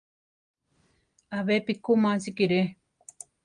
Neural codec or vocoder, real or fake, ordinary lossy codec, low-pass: none; real; Opus, 32 kbps; 9.9 kHz